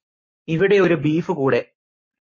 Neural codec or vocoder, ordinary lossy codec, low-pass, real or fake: codec, 44.1 kHz, 7.8 kbps, Pupu-Codec; MP3, 32 kbps; 7.2 kHz; fake